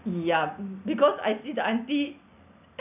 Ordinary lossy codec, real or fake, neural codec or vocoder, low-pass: none; real; none; 3.6 kHz